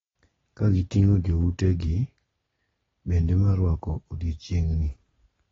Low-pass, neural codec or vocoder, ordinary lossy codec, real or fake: 7.2 kHz; none; AAC, 24 kbps; real